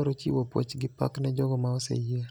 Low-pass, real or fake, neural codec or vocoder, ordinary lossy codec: none; real; none; none